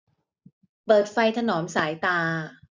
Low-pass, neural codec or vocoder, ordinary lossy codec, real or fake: none; none; none; real